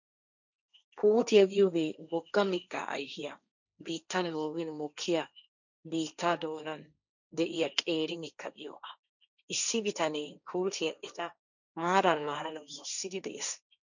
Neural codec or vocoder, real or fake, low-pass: codec, 16 kHz, 1.1 kbps, Voila-Tokenizer; fake; 7.2 kHz